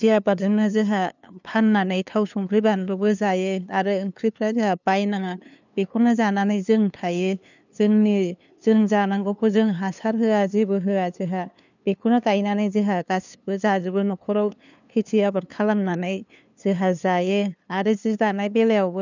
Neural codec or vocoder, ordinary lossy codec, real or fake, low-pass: codec, 16 kHz, 2 kbps, FunCodec, trained on LibriTTS, 25 frames a second; none; fake; 7.2 kHz